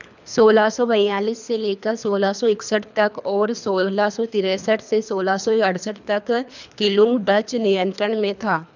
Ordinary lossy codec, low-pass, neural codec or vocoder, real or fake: none; 7.2 kHz; codec, 24 kHz, 3 kbps, HILCodec; fake